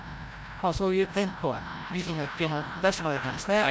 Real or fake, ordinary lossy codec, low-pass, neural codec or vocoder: fake; none; none; codec, 16 kHz, 0.5 kbps, FreqCodec, larger model